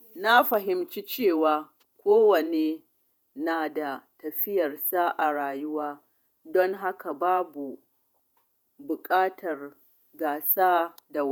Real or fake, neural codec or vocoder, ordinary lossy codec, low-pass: fake; vocoder, 48 kHz, 128 mel bands, Vocos; none; none